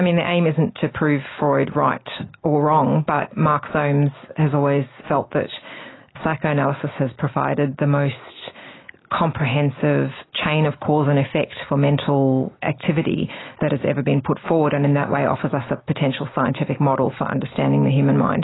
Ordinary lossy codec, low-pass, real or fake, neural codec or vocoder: AAC, 16 kbps; 7.2 kHz; real; none